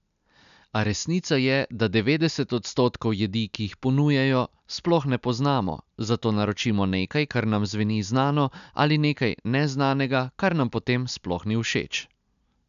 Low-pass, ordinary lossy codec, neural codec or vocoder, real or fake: 7.2 kHz; none; none; real